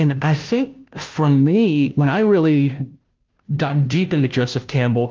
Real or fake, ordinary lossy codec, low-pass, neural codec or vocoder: fake; Opus, 32 kbps; 7.2 kHz; codec, 16 kHz, 0.5 kbps, FunCodec, trained on Chinese and English, 25 frames a second